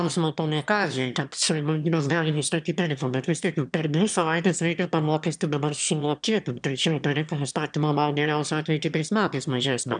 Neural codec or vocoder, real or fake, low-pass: autoencoder, 22.05 kHz, a latent of 192 numbers a frame, VITS, trained on one speaker; fake; 9.9 kHz